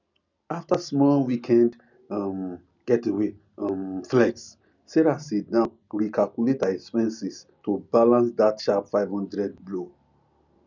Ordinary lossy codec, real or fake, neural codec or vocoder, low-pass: none; real; none; 7.2 kHz